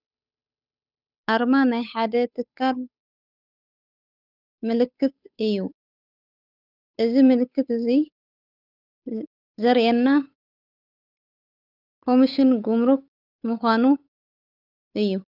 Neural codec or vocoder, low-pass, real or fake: codec, 16 kHz, 8 kbps, FunCodec, trained on Chinese and English, 25 frames a second; 5.4 kHz; fake